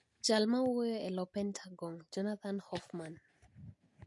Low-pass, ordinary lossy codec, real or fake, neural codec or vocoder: 10.8 kHz; MP3, 48 kbps; real; none